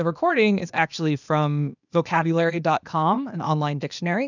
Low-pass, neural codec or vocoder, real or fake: 7.2 kHz; codec, 16 kHz, 0.8 kbps, ZipCodec; fake